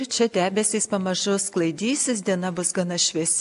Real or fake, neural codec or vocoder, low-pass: real; none; 10.8 kHz